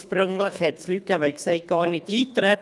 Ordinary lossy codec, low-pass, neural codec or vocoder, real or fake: none; none; codec, 24 kHz, 1.5 kbps, HILCodec; fake